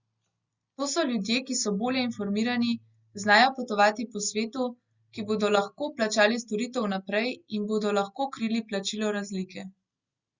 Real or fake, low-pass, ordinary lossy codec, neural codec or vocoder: real; 7.2 kHz; Opus, 64 kbps; none